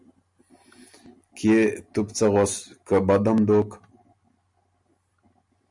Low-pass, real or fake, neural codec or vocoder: 10.8 kHz; real; none